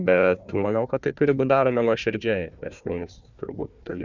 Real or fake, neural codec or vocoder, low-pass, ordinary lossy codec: fake; codec, 16 kHz, 1 kbps, FunCodec, trained on Chinese and English, 50 frames a second; 7.2 kHz; Opus, 64 kbps